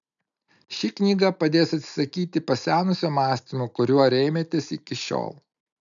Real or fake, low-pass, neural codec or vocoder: real; 7.2 kHz; none